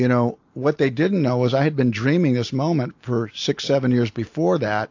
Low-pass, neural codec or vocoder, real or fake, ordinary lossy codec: 7.2 kHz; none; real; AAC, 48 kbps